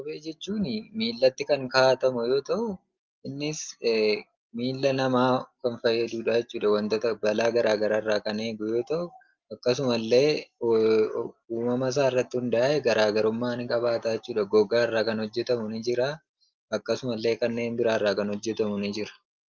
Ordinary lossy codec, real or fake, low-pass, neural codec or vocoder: Opus, 24 kbps; real; 7.2 kHz; none